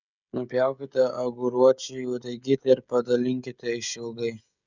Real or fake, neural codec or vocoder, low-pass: fake; codec, 16 kHz, 16 kbps, FreqCodec, smaller model; 7.2 kHz